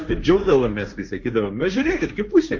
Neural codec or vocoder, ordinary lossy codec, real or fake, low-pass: codec, 16 kHz, 1.1 kbps, Voila-Tokenizer; MP3, 48 kbps; fake; 7.2 kHz